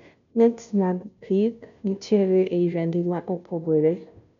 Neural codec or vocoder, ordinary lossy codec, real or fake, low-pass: codec, 16 kHz, 0.5 kbps, FunCodec, trained on Chinese and English, 25 frames a second; MP3, 64 kbps; fake; 7.2 kHz